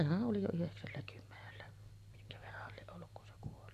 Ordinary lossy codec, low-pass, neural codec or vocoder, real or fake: none; 14.4 kHz; none; real